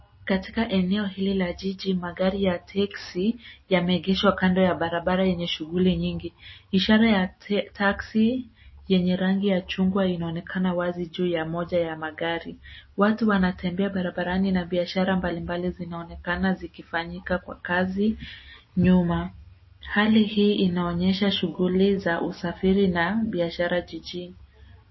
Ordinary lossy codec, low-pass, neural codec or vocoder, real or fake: MP3, 24 kbps; 7.2 kHz; none; real